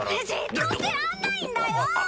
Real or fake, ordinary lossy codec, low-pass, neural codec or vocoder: real; none; none; none